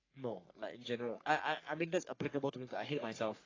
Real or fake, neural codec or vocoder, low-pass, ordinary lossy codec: fake; codec, 44.1 kHz, 3.4 kbps, Pupu-Codec; 7.2 kHz; AAC, 32 kbps